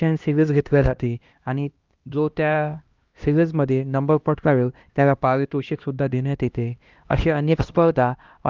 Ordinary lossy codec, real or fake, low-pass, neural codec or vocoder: Opus, 32 kbps; fake; 7.2 kHz; codec, 16 kHz, 1 kbps, X-Codec, HuBERT features, trained on LibriSpeech